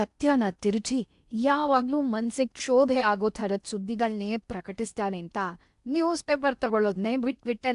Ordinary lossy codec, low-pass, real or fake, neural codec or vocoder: AAC, 96 kbps; 10.8 kHz; fake; codec, 16 kHz in and 24 kHz out, 0.8 kbps, FocalCodec, streaming, 65536 codes